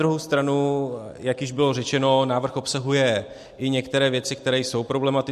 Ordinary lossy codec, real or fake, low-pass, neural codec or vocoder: MP3, 64 kbps; real; 14.4 kHz; none